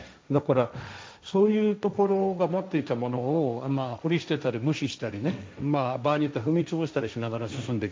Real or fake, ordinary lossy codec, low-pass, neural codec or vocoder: fake; none; none; codec, 16 kHz, 1.1 kbps, Voila-Tokenizer